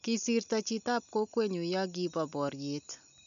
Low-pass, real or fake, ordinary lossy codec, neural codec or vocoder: 7.2 kHz; real; none; none